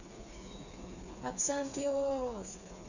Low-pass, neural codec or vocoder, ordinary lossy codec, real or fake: 7.2 kHz; codec, 16 kHz, 4 kbps, FreqCodec, smaller model; none; fake